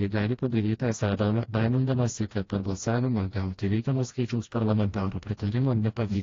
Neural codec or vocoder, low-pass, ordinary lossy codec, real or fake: codec, 16 kHz, 1 kbps, FreqCodec, smaller model; 7.2 kHz; AAC, 32 kbps; fake